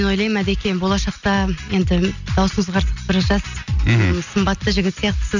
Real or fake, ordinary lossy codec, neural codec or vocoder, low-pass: real; none; none; 7.2 kHz